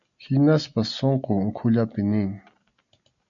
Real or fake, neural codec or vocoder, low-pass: real; none; 7.2 kHz